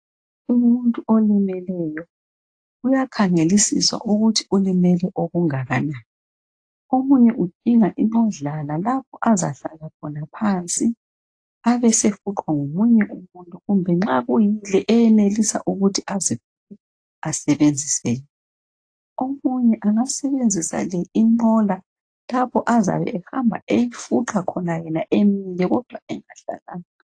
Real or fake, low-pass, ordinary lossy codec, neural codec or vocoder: real; 9.9 kHz; AAC, 48 kbps; none